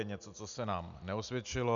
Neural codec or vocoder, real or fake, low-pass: none; real; 7.2 kHz